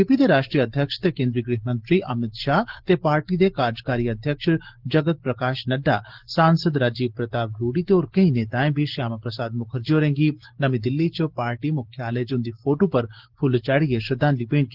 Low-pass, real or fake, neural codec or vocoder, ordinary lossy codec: 5.4 kHz; real; none; Opus, 16 kbps